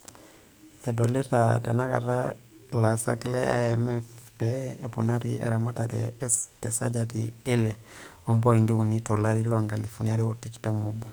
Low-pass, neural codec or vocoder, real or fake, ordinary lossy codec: none; codec, 44.1 kHz, 2.6 kbps, SNAC; fake; none